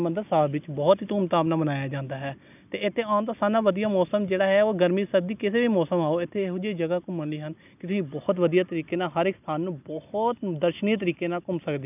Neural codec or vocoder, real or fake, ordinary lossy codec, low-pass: none; real; none; 3.6 kHz